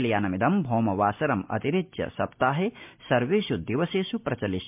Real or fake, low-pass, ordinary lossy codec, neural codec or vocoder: real; 3.6 kHz; MP3, 32 kbps; none